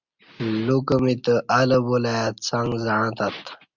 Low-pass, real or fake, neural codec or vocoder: 7.2 kHz; real; none